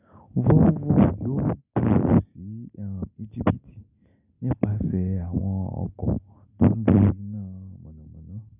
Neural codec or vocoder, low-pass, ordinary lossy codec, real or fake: none; 3.6 kHz; none; real